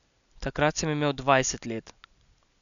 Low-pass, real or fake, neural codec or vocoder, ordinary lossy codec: 7.2 kHz; real; none; none